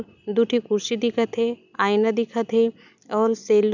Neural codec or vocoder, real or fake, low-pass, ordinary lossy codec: none; real; 7.2 kHz; none